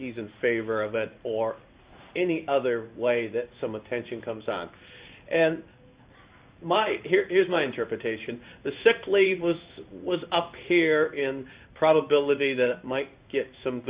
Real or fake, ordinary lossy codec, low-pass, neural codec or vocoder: fake; Opus, 64 kbps; 3.6 kHz; codec, 16 kHz in and 24 kHz out, 1 kbps, XY-Tokenizer